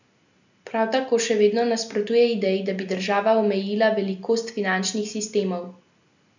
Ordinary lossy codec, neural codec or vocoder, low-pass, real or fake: none; none; 7.2 kHz; real